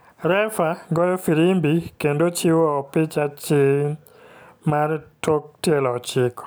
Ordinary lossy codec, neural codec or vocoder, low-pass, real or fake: none; none; none; real